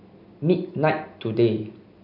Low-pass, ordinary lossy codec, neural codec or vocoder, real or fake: 5.4 kHz; none; none; real